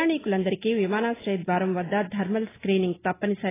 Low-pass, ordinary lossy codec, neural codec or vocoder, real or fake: 3.6 kHz; AAC, 16 kbps; none; real